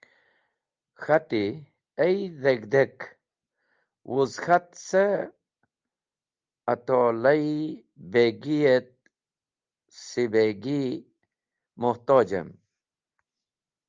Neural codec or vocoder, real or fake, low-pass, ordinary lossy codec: none; real; 7.2 kHz; Opus, 24 kbps